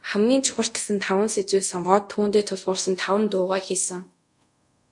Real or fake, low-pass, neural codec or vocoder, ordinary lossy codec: fake; 10.8 kHz; codec, 24 kHz, 0.5 kbps, DualCodec; MP3, 96 kbps